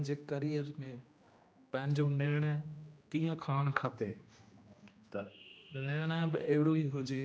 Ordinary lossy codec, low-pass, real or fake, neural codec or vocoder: none; none; fake; codec, 16 kHz, 1 kbps, X-Codec, HuBERT features, trained on general audio